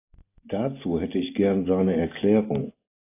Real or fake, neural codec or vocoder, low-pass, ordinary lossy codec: real; none; 3.6 kHz; AAC, 24 kbps